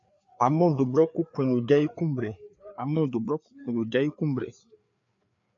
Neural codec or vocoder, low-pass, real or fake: codec, 16 kHz, 4 kbps, FreqCodec, larger model; 7.2 kHz; fake